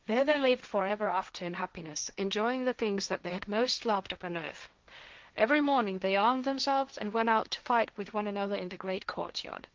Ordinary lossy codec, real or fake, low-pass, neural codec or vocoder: Opus, 32 kbps; fake; 7.2 kHz; codec, 16 kHz, 1.1 kbps, Voila-Tokenizer